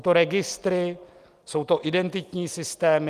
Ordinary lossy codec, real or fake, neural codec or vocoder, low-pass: Opus, 32 kbps; real; none; 14.4 kHz